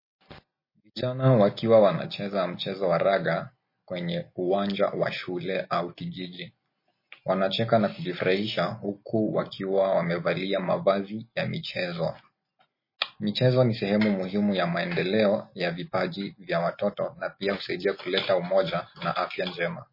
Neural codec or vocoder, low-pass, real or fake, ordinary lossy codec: none; 5.4 kHz; real; MP3, 24 kbps